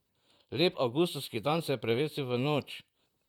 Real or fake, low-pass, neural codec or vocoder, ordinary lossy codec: fake; 19.8 kHz; vocoder, 44.1 kHz, 128 mel bands, Pupu-Vocoder; none